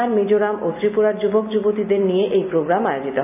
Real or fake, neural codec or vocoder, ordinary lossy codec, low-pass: real; none; none; 3.6 kHz